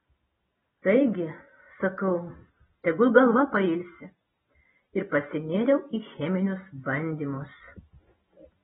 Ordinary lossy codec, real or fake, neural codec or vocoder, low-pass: AAC, 16 kbps; real; none; 19.8 kHz